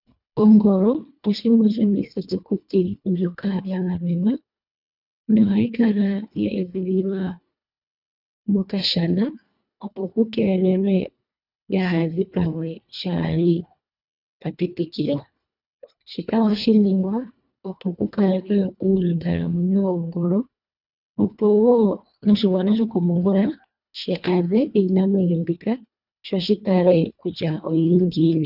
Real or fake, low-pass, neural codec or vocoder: fake; 5.4 kHz; codec, 24 kHz, 1.5 kbps, HILCodec